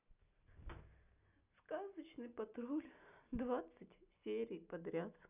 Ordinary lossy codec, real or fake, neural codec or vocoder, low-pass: none; real; none; 3.6 kHz